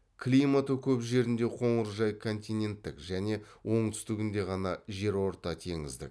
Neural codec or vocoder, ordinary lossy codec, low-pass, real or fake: none; none; none; real